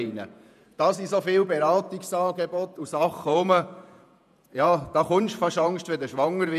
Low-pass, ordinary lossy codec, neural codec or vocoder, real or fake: 14.4 kHz; none; vocoder, 48 kHz, 128 mel bands, Vocos; fake